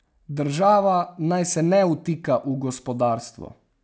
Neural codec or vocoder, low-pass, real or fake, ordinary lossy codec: none; none; real; none